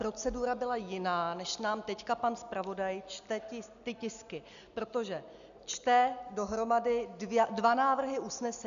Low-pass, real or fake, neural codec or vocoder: 7.2 kHz; real; none